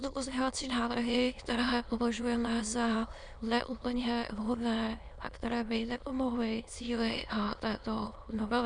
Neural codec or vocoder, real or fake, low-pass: autoencoder, 22.05 kHz, a latent of 192 numbers a frame, VITS, trained on many speakers; fake; 9.9 kHz